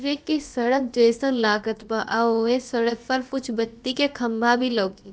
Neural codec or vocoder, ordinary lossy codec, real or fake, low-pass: codec, 16 kHz, about 1 kbps, DyCAST, with the encoder's durations; none; fake; none